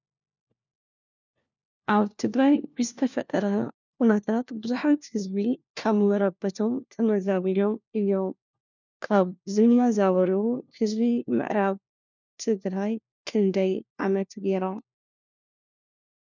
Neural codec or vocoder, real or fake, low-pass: codec, 16 kHz, 1 kbps, FunCodec, trained on LibriTTS, 50 frames a second; fake; 7.2 kHz